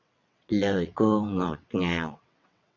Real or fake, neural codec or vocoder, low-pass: fake; vocoder, 22.05 kHz, 80 mel bands, WaveNeXt; 7.2 kHz